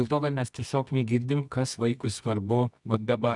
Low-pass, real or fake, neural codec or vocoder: 10.8 kHz; fake; codec, 24 kHz, 0.9 kbps, WavTokenizer, medium music audio release